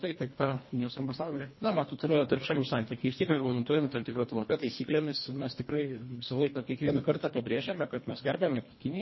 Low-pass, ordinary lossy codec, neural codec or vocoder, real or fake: 7.2 kHz; MP3, 24 kbps; codec, 24 kHz, 1.5 kbps, HILCodec; fake